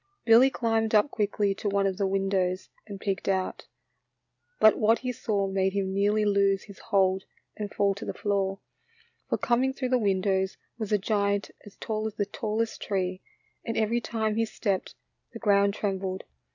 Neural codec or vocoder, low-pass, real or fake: none; 7.2 kHz; real